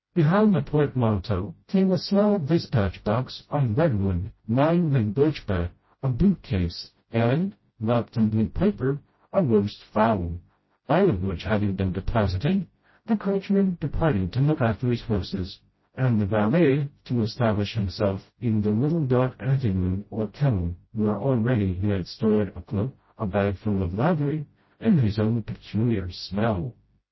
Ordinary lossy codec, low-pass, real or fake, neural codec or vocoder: MP3, 24 kbps; 7.2 kHz; fake; codec, 16 kHz, 0.5 kbps, FreqCodec, smaller model